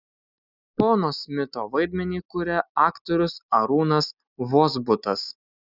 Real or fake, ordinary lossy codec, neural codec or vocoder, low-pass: real; Opus, 64 kbps; none; 5.4 kHz